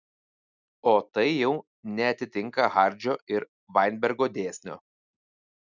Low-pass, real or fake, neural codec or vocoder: 7.2 kHz; real; none